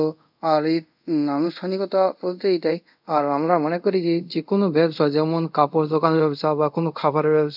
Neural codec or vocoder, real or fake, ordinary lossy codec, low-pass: codec, 24 kHz, 0.5 kbps, DualCodec; fake; none; 5.4 kHz